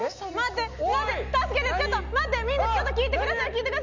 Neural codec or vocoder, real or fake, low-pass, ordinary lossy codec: none; real; 7.2 kHz; none